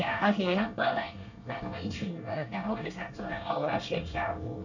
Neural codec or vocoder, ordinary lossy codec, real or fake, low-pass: codec, 24 kHz, 1 kbps, SNAC; none; fake; 7.2 kHz